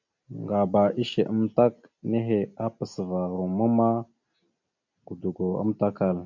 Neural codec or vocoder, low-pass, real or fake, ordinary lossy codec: none; 7.2 kHz; real; AAC, 48 kbps